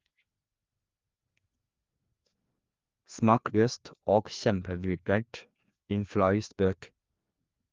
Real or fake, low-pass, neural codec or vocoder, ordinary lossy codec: fake; 7.2 kHz; codec, 16 kHz, 2 kbps, FreqCodec, larger model; Opus, 32 kbps